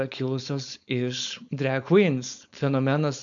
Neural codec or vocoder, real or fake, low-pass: codec, 16 kHz, 4.8 kbps, FACodec; fake; 7.2 kHz